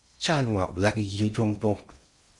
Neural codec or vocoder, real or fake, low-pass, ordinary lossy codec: codec, 16 kHz in and 24 kHz out, 0.6 kbps, FocalCodec, streaming, 4096 codes; fake; 10.8 kHz; Opus, 64 kbps